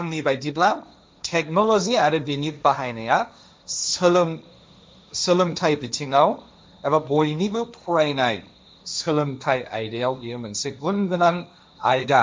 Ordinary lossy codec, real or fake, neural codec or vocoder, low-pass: none; fake; codec, 16 kHz, 1.1 kbps, Voila-Tokenizer; none